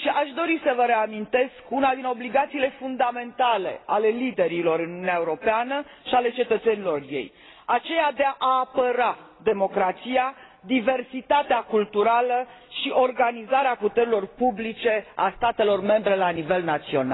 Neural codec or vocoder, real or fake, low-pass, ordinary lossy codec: none; real; 7.2 kHz; AAC, 16 kbps